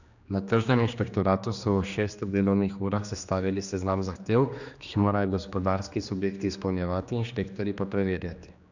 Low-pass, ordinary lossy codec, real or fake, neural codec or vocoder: 7.2 kHz; none; fake; codec, 16 kHz, 2 kbps, X-Codec, HuBERT features, trained on general audio